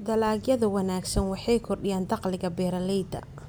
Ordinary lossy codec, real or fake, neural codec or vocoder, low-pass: none; real; none; none